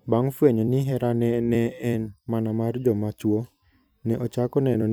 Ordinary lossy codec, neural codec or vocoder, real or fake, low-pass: none; vocoder, 44.1 kHz, 128 mel bands every 256 samples, BigVGAN v2; fake; none